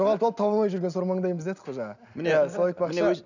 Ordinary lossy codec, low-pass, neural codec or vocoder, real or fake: none; 7.2 kHz; none; real